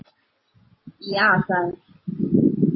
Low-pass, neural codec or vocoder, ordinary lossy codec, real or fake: 7.2 kHz; none; MP3, 24 kbps; real